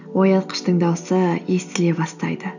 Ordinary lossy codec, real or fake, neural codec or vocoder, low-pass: none; real; none; 7.2 kHz